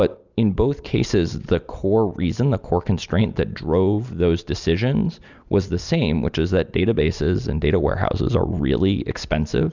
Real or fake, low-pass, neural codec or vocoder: real; 7.2 kHz; none